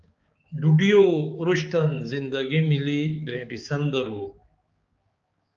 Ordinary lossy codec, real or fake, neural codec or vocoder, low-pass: Opus, 24 kbps; fake; codec, 16 kHz, 4 kbps, X-Codec, HuBERT features, trained on balanced general audio; 7.2 kHz